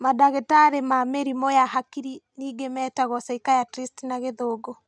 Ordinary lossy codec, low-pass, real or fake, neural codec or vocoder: none; none; real; none